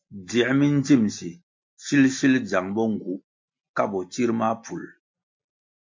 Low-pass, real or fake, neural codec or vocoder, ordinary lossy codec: 7.2 kHz; real; none; MP3, 48 kbps